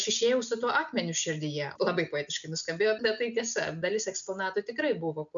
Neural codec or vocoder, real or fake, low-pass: none; real; 7.2 kHz